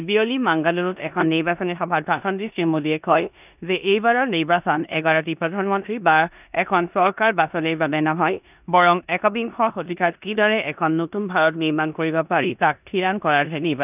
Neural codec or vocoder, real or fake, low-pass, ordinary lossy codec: codec, 16 kHz in and 24 kHz out, 0.9 kbps, LongCat-Audio-Codec, four codebook decoder; fake; 3.6 kHz; none